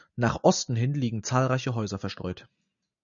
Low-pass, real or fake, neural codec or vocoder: 7.2 kHz; real; none